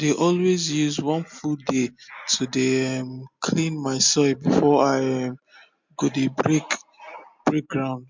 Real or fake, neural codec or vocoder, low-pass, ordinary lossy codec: real; none; 7.2 kHz; MP3, 64 kbps